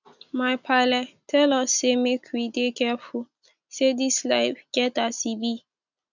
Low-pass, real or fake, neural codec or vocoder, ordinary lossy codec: 7.2 kHz; real; none; none